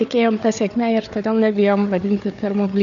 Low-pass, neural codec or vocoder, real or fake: 7.2 kHz; codec, 16 kHz, 4 kbps, FunCodec, trained on Chinese and English, 50 frames a second; fake